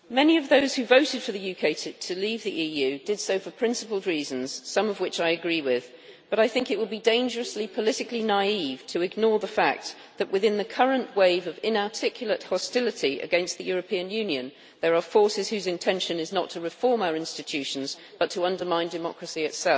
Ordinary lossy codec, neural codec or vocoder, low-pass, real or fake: none; none; none; real